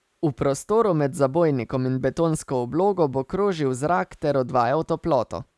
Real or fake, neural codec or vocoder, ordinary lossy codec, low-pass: real; none; none; none